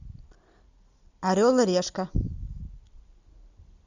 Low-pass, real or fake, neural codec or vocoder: 7.2 kHz; real; none